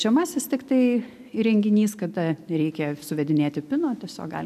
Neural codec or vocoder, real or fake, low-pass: none; real; 14.4 kHz